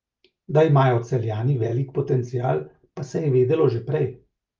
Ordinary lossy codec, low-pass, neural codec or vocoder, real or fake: Opus, 32 kbps; 7.2 kHz; none; real